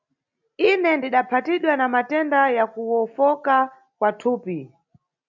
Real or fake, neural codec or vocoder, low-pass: real; none; 7.2 kHz